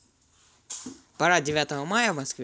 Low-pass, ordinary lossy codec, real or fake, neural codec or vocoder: none; none; real; none